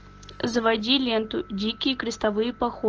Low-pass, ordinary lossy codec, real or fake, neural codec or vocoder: 7.2 kHz; Opus, 16 kbps; real; none